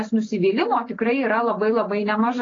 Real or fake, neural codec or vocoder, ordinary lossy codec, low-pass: real; none; AAC, 32 kbps; 7.2 kHz